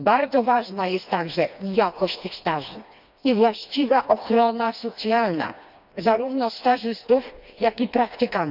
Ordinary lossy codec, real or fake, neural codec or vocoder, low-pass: none; fake; codec, 16 kHz, 2 kbps, FreqCodec, smaller model; 5.4 kHz